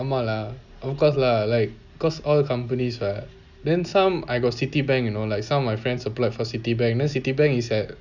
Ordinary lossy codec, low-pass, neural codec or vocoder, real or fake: none; 7.2 kHz; none; real